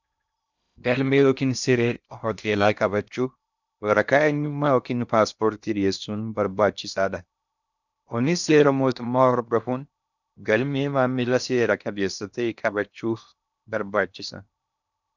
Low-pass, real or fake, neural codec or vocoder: 7.2 kHz; fake; codec, 16 kHz in and 24 kHz out, 0.6 kbps, FocalCodec, streaming, 2048 codes